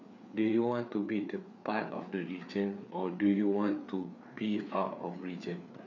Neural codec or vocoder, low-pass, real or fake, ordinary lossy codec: codec, 16 kHz, 4 kbps, FreqCodec, larger model; 7.2 kHz; fake; none